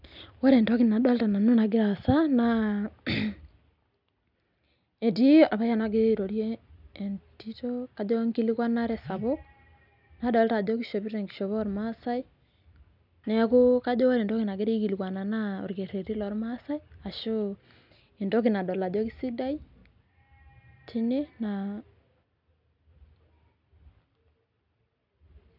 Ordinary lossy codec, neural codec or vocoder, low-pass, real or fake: none; none; 5.4 kHz; real